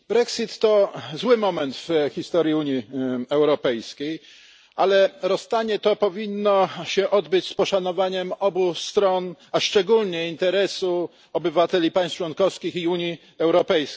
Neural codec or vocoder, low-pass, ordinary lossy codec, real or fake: none; none; none; real